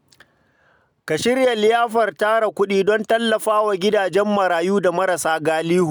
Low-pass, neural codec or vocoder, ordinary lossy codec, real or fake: none; none; none; real